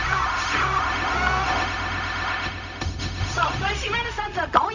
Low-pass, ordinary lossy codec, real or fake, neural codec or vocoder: 7.2 kHz; none; fake; codec, 16 kHz, 0.4 kbps, LongCat-Audio-Codec